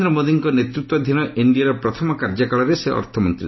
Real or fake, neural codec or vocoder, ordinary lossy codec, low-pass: real; none; MP3, 24 kbps; 7.2 kHz